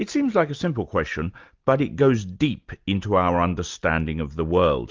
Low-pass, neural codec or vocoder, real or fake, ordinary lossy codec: 7.2 kHz; none; real; Opus, 24 kbps